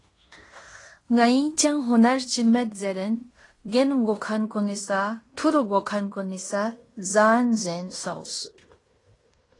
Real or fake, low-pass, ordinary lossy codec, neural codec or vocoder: fake; 10.8 kHz; AAC, 32 kbps; codec, 16 kHz in and 24 kHz out, 0.9 kbps, LongCat-Audio-Codec, fine tuned four codebook decoder